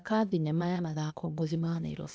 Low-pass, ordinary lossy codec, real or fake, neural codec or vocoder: none; none; fake; codec, 16 kHz, 0.8 kbps, ZipCodec